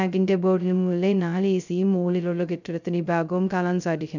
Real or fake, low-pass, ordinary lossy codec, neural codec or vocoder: fake; 7.2 kHz; none; codec, 16 kHz, 0.2 kbps, FocalCodec